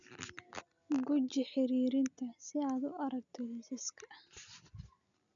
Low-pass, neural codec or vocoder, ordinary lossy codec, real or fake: 7.2 kHz; none; none; real